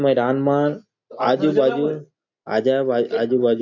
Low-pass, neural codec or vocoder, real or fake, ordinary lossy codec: 7.2 kHz; none; real; none